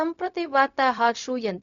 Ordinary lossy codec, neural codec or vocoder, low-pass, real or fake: none; codec, 16 kHz, 0.4 kbps, LongCat-Audio-Codec; 7.2 kHz; fake